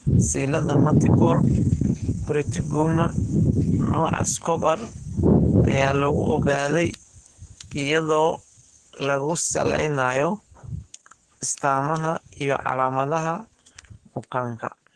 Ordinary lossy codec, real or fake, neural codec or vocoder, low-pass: Opus, 16 kbps; fake; codec, 44.1 kHz, 2.6 kbps, SNAC; 10.8 kHz